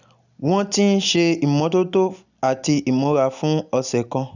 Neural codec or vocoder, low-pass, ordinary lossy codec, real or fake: none; 7.2 kHz; none; real